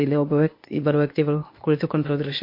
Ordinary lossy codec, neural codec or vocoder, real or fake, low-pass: MP3, 32 kbps; codec, 16 kHz, 0.8 kbps, ZipCodec; fake; 5.4 kHz